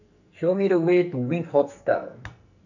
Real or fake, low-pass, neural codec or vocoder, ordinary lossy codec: fake; 7.2 kHz; codec, 44.1 kHz, 2.6 kbps, SNAC; none